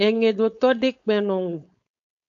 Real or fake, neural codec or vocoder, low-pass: fake; codec, 16 kHz, 4.8 kbps, FACodec; 7.2 kHz